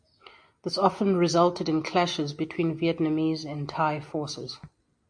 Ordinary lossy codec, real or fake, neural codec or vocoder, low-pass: MP3, 48 kbps; real; none; 9.9 kHz